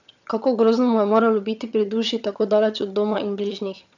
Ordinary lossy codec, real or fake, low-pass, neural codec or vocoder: none; fake; 7.2 kHz; vocoder, 22.05 kHz, 80 mel bands, HiFi-GAN